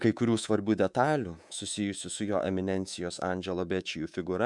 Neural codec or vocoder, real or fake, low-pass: codec, 24 kHz, 3.1 kbps, DualCodec; fake; 10.8 kHz